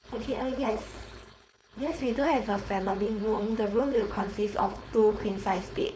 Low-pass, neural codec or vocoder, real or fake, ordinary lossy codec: none; codec, 16 kHz, 4.8 kbps, FACodec; fake; none